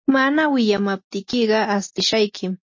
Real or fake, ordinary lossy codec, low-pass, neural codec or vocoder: real; MP3, 32 kbps; 7.2 kHz; none